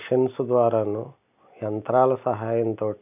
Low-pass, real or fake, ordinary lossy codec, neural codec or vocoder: 3.6 kHz; real; none; none